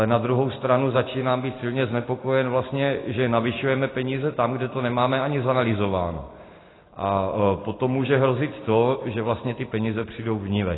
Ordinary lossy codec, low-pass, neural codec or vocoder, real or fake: AAC, 16 kbps; 7.2 kHz; none; real